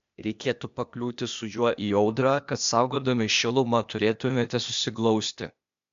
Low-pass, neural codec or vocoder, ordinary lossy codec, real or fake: 7.2 kHz; codec, 16 kHz, 0.8 kbps, ZipCodec; MP3, 64 kbps; fake